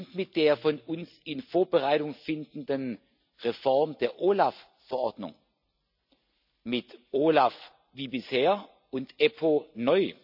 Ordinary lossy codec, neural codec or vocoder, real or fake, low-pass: none; none; real; 5.4 kHz